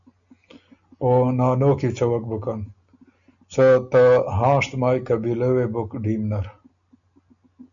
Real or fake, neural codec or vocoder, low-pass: real; none; 7.2 kHz